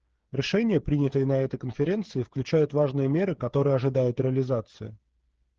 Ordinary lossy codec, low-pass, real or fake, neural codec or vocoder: Opus, 16 kbps; 7.2 kHz; fake; codec, 16 kHz, 8 kbps, FreqCodec, smaller model